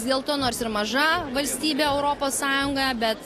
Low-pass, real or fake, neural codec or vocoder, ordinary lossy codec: 14.4 kHz; real; none; AAC, 48 kbps